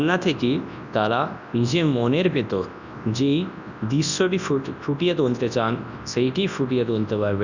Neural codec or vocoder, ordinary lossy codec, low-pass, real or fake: codec, 24 kHz, 0.9 kbps, WavTokenizer, large speech release; none; 7.2 kHz; fake